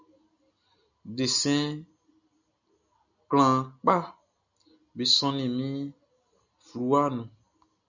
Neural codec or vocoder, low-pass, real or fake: none; 7.2 kHz; real